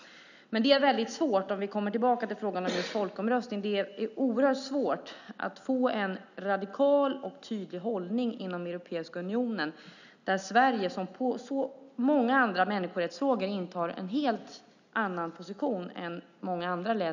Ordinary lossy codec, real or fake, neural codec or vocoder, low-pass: none; real; none; 7.2 kHz